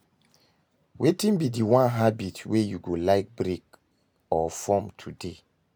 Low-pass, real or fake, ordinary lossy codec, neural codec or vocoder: none; real; none; none